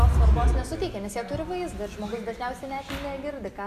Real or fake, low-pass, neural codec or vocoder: real; 14.4 kHz; none